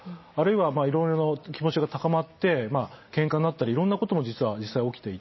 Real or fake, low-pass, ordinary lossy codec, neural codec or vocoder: real; 7.2 kHz; MP3, 24 kbps; none